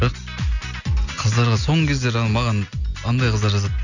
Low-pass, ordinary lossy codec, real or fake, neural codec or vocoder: 7.2 kHz; none; real; none